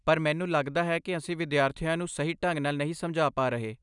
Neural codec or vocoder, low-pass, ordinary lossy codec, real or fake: none; 10.8 kHz; none; real